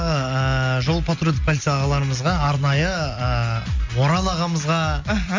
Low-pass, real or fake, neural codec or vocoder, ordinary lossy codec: 7.2 kHz; real; none; MP3, 48 kbps